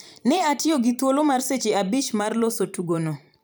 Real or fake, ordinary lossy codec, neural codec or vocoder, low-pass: fake; none; vocoder, 44.1 kHz, 128 mel bands every 512 samples, BigVGAN v2; none